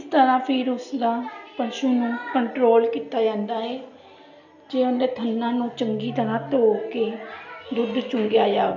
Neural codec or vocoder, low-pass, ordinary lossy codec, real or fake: none; 7.2 kHz; none; real